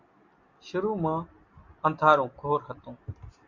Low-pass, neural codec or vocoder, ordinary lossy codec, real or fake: 7.2 kHz; none; MP3, 64 kbps; real